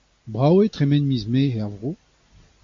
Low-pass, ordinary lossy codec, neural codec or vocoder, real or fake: 7.2 kHz; MP3, 48 kbps; none; real